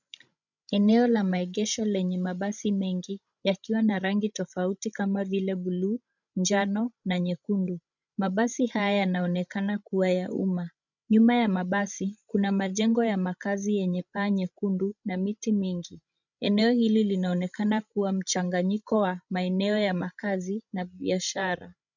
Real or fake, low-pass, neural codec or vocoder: fake; 7.2 kHz; codec, 16 kHz, 16 kbps, FreqCodec, larger model